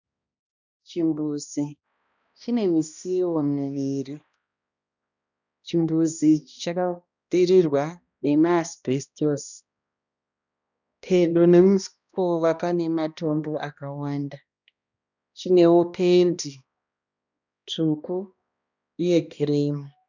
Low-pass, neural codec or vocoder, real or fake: 7.2 kHz; codec, 16 kHz, 1 kbps, X-Codec, HuBERT features, trained on balanced general audio; fake